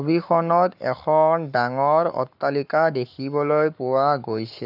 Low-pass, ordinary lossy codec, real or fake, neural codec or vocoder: 5.4 kHz; none; fake; codec, 44.1 kHz, 7.8 kbps, Pupu-Codec